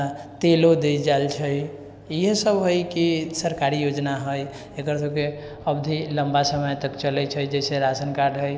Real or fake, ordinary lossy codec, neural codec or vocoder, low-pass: real; none; none; none